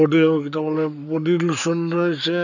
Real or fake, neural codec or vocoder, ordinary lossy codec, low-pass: fake; codec, 44.1 kHz, 7.8 kbps, Pupu-Codec; none; 7.2 kHz